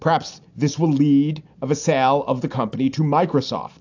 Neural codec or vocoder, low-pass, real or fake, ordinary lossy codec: none; 7.2 kHz; real; AAC, 48 kbps